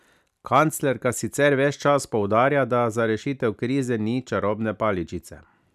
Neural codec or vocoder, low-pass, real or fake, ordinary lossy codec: none; 14.4 kHz; real; none